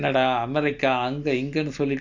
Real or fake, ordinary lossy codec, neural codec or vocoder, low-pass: real; Opus, 64 kbps; none; 7.2 kHz